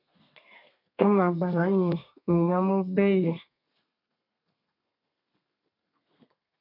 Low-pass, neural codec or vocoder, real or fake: 5.4 kHz; codec, 32 kHz, 1.9 kbps, SNAC; fake